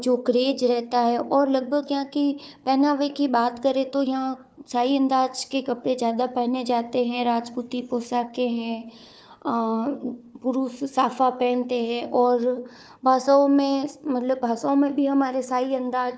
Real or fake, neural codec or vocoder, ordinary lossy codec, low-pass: fake; codec, 16 kHz, 4 kbps, FunCodec, trained on Chinese and English, 50 frames a second; none; none